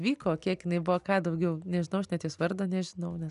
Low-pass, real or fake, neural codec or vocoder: 10.8 kHz; real; none